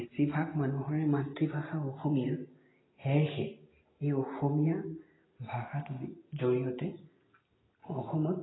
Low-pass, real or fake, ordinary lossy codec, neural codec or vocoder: 7.2 kHz; real; AAC, 16 kbps; none